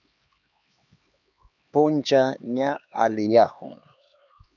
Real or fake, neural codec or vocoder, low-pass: fake; codec, 16 kHz, 2 kbps, X-Codec, HuBERT features, trained on LibriSpeech; 7.2 kHz